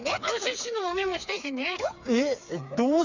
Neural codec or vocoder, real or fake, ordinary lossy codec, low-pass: codec, 16 kHz, 4 kbps, FreqCodec, smaller model; fake; none; 7.2 kHz